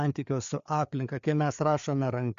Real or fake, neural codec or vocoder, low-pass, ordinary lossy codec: fake; codec, 16 kHz, 4 kbps, FreqCodec, larger model; 7.2 kHz; MP3, 64 kbps